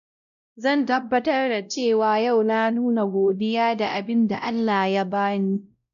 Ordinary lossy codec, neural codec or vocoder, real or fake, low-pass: none; codec, 16 kHz, 0.5 kbps, X-Codec, WavLM features, trained on Multilingual LibriSpeech; fake; 7.2 kHz